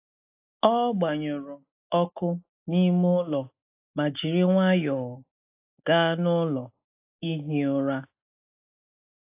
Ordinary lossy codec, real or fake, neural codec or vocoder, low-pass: AAC, 24 kbps; real; none; 3.6 kHz